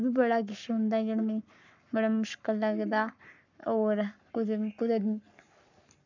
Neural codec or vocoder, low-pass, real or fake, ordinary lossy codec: codec, 44.1 kHz, 7.8 kbps, Pupu-Codec; 7.2 kHz; fake; none